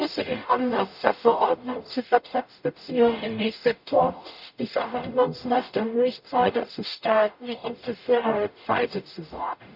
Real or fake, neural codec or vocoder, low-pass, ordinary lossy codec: fake; codec, 44.1 kHz, 0.9 kbps, DAC; 5.4 kHz; none